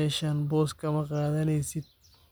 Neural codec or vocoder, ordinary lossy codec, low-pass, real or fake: none; none; none; real